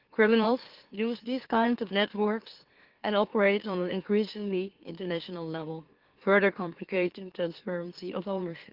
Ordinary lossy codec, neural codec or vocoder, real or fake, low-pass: Opus, 16 kbps; autoencoder, 44.1 kHz, a latent of 192 numbers a frame, MeloTTS; fake; 5.4 kHz